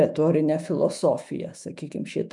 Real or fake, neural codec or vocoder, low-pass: fake; autoencoder, 48 kHz, 128 numbers a frame, DAC-VAE, trained on Japanese speech; 10.8 kHz